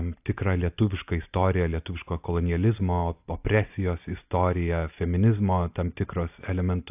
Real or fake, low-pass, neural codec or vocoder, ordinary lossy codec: real; 3.6 kHz; none; AAC, 32 kbps